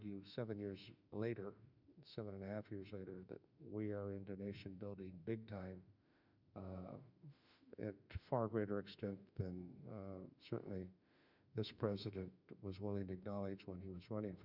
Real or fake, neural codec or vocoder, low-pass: fake; autoencoder, 48 kHz, 32 numbers a frame, DAC-VAE, trained on Japanese speech; 5.4 kHz